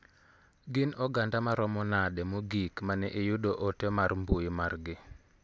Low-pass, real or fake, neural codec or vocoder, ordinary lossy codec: none; real; none; none